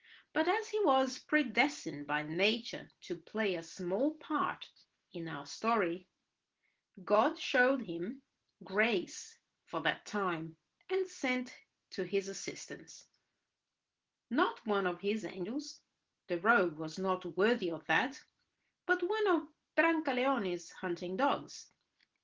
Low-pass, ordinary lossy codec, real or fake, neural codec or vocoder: 7.2 kHz; Opus, 16 kbps; real; none